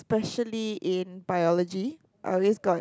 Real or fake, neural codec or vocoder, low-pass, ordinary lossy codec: real; none; none; none